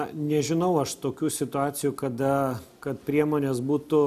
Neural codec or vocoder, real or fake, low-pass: none; real; 14.4 kHz